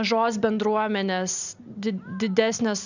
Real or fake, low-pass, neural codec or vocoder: real; 7.2 kHz; none